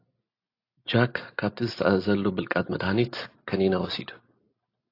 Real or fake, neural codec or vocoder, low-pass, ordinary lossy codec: real; none; 5.4 kHz; AAC, 32 kbps